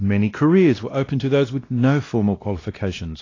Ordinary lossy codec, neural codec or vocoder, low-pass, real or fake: AAC, 32 kbps; codec, 16 kHz, 1 kbps, X-Codec, WavLM features, trained on Multilingual LibriSpeech; 7.2 kHz; fake